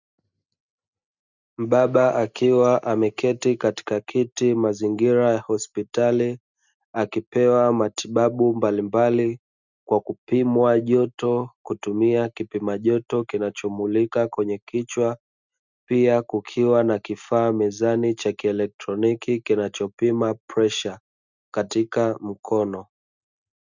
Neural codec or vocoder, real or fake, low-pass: none; real; 7.2 kHz